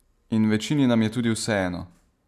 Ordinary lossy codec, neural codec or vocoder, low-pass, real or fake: AAC, 96 kbps; none; 14.4 kHz; real